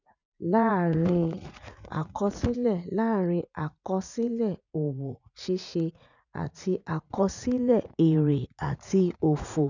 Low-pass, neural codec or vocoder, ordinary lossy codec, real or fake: 7.2 kHz; vocoder, 44.1 kHz, 80 mel bands, Vocos; none; fake